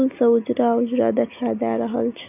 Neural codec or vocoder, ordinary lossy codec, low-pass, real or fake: none; none; 3.6 kHz; real